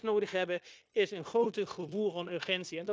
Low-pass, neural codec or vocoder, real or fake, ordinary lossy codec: none; codec, 16 kHz, 0.9 kbps, LongCat-Audio-Codec; fake; none